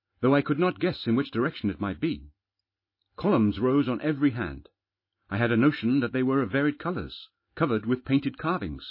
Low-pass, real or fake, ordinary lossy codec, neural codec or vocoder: 5.4 kHz; real; MP3, 24 kbps; none